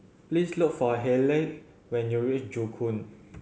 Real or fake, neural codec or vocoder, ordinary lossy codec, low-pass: real; none; none; none